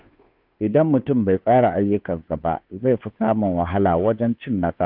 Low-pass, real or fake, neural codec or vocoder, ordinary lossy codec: 5.4 kHz; fake; codec, 16 kHz, 2 kbps, FunCodec, trained on Chinese and English, 25 frames a second; none